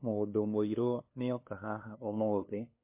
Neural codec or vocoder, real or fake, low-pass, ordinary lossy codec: codec, 16 kHz, 2 kbps, FunCodec, trained on LibriTTS, 25 frames a second; fake; 3.6 kHz; MP3, 24 kbps